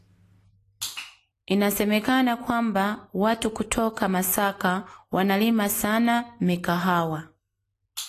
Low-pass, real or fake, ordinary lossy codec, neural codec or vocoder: 14.4 kHz; real; AAC, 48 kbps; none